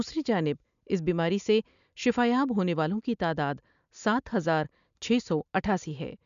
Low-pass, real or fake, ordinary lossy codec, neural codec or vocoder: 7.2 kHz; real; AAC, 96 kbps; none